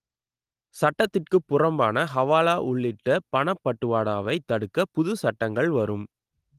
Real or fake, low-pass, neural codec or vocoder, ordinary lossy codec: real; 14.4 kHz; none; Opus, 24 kbps